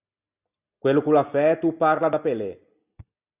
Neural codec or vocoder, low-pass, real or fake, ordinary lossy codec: none; 3.6 kHz; real; Opus, 64 kbps